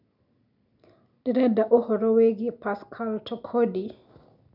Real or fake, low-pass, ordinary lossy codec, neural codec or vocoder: real; 5.4 kHz; none; none